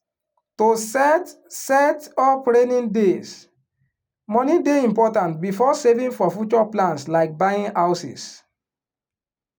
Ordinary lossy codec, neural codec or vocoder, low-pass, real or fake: none; none; none; real